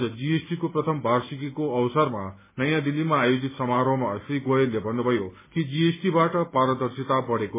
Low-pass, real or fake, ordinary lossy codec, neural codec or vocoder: 3.6 kHz; real; MP3, 16 kbps; none